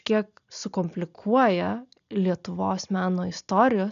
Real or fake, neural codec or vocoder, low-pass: real; none; 7.2 kHz